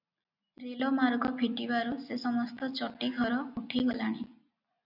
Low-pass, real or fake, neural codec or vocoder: 5.4 kHz; real; none